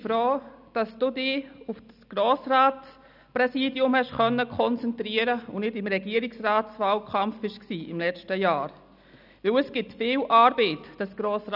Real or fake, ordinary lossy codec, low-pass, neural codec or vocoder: real; none; 5.4 kHz; none